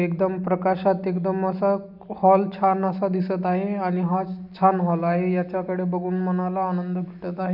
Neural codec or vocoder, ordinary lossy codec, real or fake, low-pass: none; none; real; 5.4 kHz